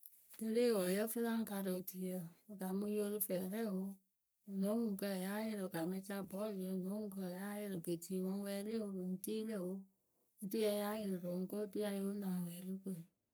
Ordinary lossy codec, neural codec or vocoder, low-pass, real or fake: none; codec, 44.1 kHz, 3.4 kbps, Pupu-Codec; none; fake